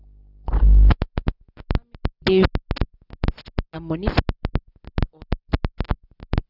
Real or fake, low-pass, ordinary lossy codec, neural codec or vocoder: real; 5.4 kHz; none; none